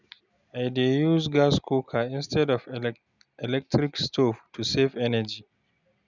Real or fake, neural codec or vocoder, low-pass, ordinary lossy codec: real; none; 7.2 kHz; none